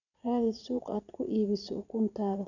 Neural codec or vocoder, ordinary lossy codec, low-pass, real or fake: vocoder, 44.1 kHz, 80 mel bands, Vocos; none; 7.2 kHz; fake